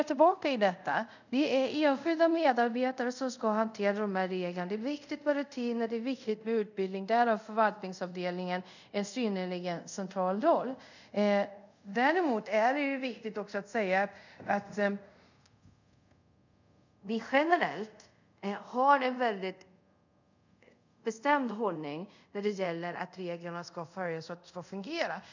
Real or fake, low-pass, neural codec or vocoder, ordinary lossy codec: fake; 7.2 kHz; codec, 24 kHz, 0.5 kbps, DualCodec; none